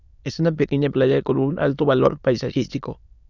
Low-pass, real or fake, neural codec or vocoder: 7.2 kHz; fake; autoencoder, 22.05 kHz, a latent of 192 numbers a frame, VITS, trained on many speakers